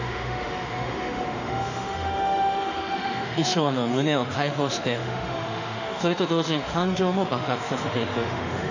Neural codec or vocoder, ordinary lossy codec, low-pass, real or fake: autoencoder, 48 kHz, 32 numbers a frame, DAC-VAE, trained on Japanese speech; none; 7.2 kHz; fake